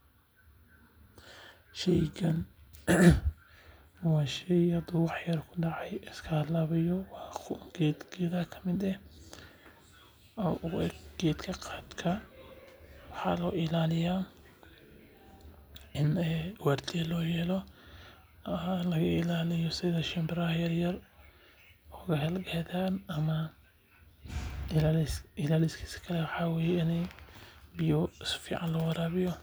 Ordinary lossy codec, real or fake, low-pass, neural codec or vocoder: none; real; none; none